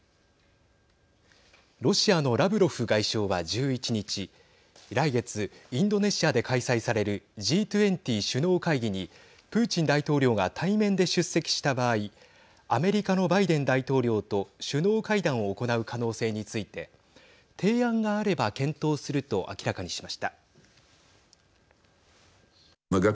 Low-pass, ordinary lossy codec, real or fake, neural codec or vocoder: none; none; real; none